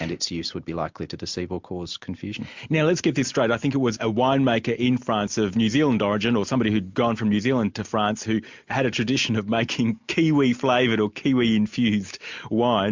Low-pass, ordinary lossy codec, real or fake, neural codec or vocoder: 7.2 kHz; MP3, 64 kbps; real; none